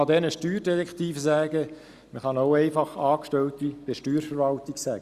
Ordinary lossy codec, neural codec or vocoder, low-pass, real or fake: Opus, 64 kbps; none; 14.4 kHz; real